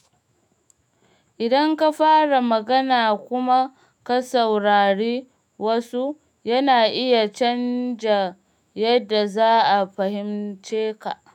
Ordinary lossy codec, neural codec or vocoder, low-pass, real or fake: none; autoencoder, 48 kHz, 128 numbers a frame, DAC-VAE, trained on Japanese speech; 19.8 kHz; fake